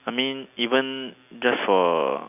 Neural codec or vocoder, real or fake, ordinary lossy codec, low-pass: none; real; none; 3.6 kHz